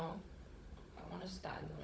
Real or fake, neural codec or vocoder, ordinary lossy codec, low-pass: fake; codec, 16 kHz, 16 kbps, FunCodec, trained on Chinese and English, 50 frames a second; none; none